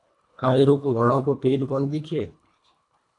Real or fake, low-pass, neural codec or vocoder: fake; 10.8 kHz; codec, 24 kHz, 1.5 kbps, HILCodec